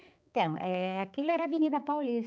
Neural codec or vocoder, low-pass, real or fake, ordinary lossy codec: codec, 16 kHz, 4 kbps, X-Codec, HuBERT features, trained on balanced general audio; none; fake; none